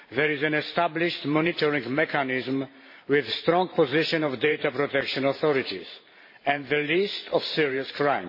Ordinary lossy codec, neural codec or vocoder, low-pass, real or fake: MP3, 24 kbps; vocoder, 44.1 kHz, 128 mel bands every 512 samples, BigVGAN v2; 5.4 kHz; fake